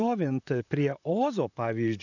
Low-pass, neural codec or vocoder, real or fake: 7.2 kHz; vocoder, 44.1 kHz, 128 mel bands, Pupu-Vocoder; fake